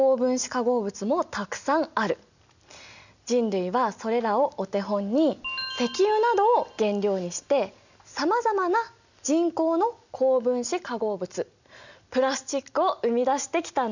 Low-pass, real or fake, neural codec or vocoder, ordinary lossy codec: 7.2 kHz; real; none; none